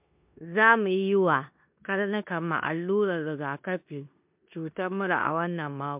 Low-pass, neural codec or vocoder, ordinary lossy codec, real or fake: 3.6 kHz; codec, 16 kHz in and 24 kHz out, 0.9 kbps, LongCat-Audio-Codec, four codebook decoder; MP3, 32 kbps; fake